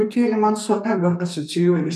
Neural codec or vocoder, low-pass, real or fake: codec, 32 kHz, 1.9 kbps, SNAC; 14.4 kHz; fake